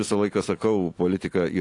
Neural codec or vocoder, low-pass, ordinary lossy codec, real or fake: none; 10.8 kHz; AAC, 48 kbps; real